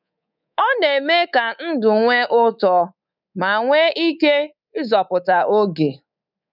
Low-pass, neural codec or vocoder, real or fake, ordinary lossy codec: 5.4 kHz; codec, 24 kHz, 3.1 kbps, DualCodec; fake; none